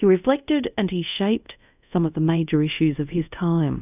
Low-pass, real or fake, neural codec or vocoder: 3.6 kHz; fake; codec, 24 kHz, 0.5 kbps, DualCodec